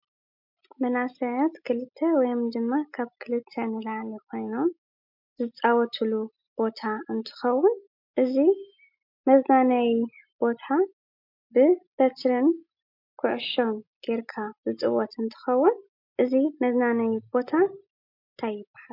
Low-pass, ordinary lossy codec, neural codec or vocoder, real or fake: 5.4 kHz; MP3, 48 kbps; none; real